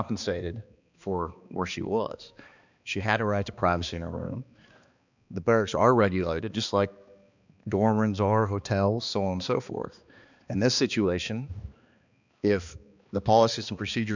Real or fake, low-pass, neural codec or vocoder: fake; 7.2 kHz; codec, 16 kHz, 2 kbps, X-Codec, HuBERT features, trained on balanced general audio